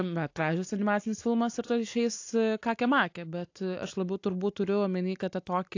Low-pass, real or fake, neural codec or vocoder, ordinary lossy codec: 7.2 kHz; real; none; AAC, 48 kbps